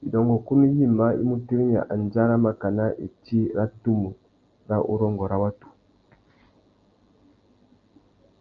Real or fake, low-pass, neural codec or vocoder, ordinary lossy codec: real; 7.2 kHz; none; Opus, 24 kbps